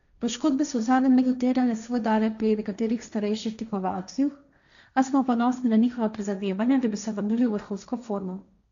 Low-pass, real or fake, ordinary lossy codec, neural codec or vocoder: 7.2 kHz; fake; none; codec, 16 kHz, 1.1 kbps, Voila-Tokenizer